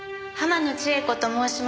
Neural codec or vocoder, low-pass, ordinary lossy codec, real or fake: none; none; none; real